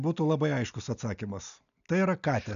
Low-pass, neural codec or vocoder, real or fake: 7.2 kHz; none; real